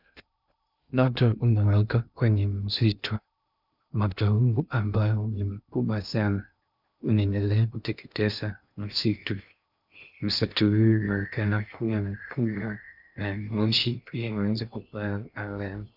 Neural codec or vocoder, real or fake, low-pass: codec, 16 kHz in and 24 kHz out, 0.6 kbps, FocalCodec, streaming, 2048 codes; fake; 5.4 kHz